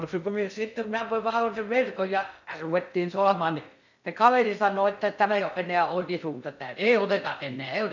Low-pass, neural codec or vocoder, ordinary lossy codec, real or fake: 7.2 kHz; codec, 16 kHz in and 24 kHz out, 0.6 kbps, FocalCodec, streaming, 2048 codes; none; fake